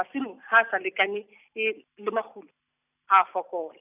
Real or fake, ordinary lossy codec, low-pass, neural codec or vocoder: real; none; 3.6 kHz; none